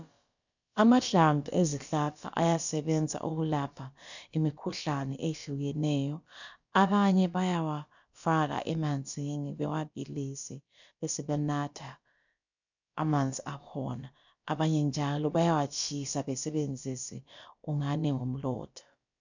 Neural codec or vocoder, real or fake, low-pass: codec, 16 kHz, about 1 kbps, DyCAST, with the encoder's durations; fake; 7.2 kHz